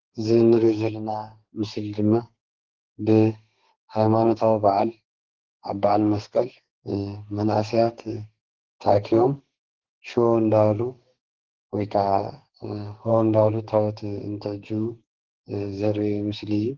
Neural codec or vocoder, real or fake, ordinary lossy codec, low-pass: codec, 32 kHz, 1.9 kbps, SNAC; fake; Opus, 16 kbps; 7.2 kHz